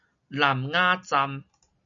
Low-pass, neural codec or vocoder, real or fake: 7.2 kHz; none; real